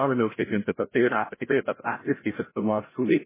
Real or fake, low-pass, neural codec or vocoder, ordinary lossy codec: fake; 3.6 kHz; codec, 16 kHz, 0.5 kbps, FreqCodec, larger model; MP3, 16 kbps